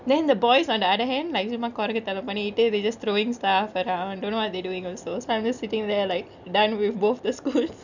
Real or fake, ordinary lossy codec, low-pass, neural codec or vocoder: real; none; 7.2 kHz; none